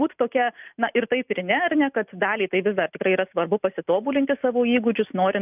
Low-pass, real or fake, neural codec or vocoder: 3.6 kHz; real; none